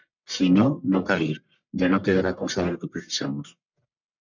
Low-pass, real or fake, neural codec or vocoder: 7.2 kHz; fake; codec, 44.1 kHz, 1.7 kbps, Pupu-Codec